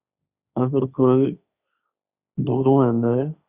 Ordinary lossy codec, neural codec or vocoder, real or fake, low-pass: Opus, 64 kbps; codec, 16 kHz, 1.1 kbps, Voila-Tokenizer; fake; 3.6 kHz